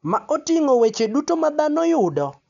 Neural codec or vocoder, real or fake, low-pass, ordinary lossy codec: none; real; 7.2 kHz; none